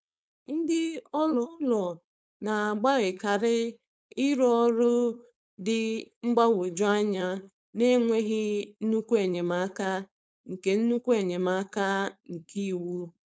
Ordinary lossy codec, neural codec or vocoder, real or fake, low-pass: none; codec, 16 kHz, 4.8 kbps, FACodec; fake; none